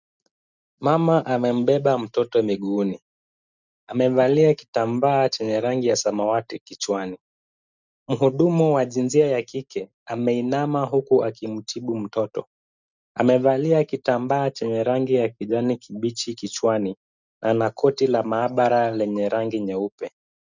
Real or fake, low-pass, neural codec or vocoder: real; 7.2 kHz; none